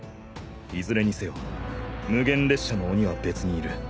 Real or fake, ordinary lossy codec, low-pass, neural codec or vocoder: real; none; none; none